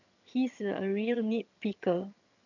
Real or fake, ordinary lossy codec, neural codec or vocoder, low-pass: fake; none; vocoder, 22.05 kHz, 80 mel bands, HiFi-GAN; 7.2 kHz